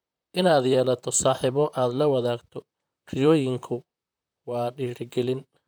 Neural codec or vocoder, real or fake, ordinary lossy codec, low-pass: vocoder, 44.1 kHz, 128 mel bands, Pupu-Vocoder; fake; none; none